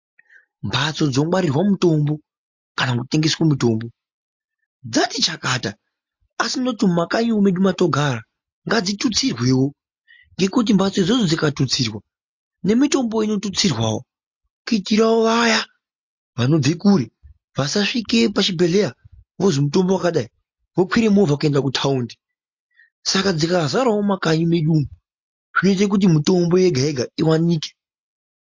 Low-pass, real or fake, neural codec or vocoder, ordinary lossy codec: 7.2 kHz; real; none; MP3, 48 kbps